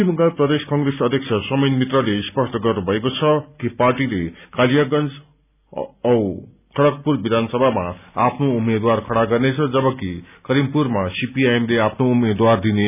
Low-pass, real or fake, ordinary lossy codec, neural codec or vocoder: 3.6 kHz; real; none; none